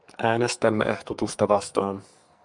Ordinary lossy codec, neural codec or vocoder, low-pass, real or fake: MP3, 96 kbps; codec, 44.1 kHz, 2.6 kbps, SNAC; 10.8 kHz; fake